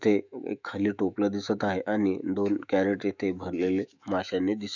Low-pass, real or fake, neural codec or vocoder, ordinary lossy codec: 7.2 kHz; fake; autoencoder, 48 kHz, 128 numbers a frame, DAC-VAE, trained on Japanese speech; none